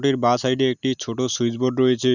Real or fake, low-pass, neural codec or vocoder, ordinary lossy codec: real; 7.2 kHz; none; none